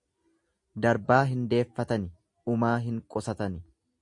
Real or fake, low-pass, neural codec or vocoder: real; 10.8 kHz; none